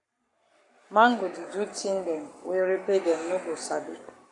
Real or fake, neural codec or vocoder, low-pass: fake; codec, 44.1 kHz, 7.8 kbps, Pupu-Codec; 10.8 kHz